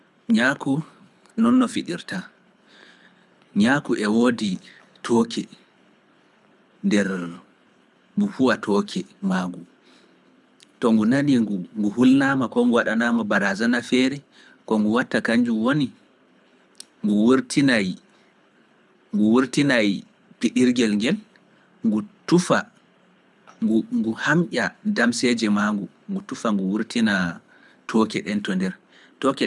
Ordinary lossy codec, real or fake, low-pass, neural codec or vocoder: none; fake; none; codec, 24 kHz, 6 kbps, HILCodec